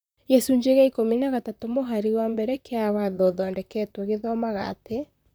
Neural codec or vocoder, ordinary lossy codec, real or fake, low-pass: vocoder, 44.1 kHz, 128 mel bands, Pupu-Vocoder; none; fake; none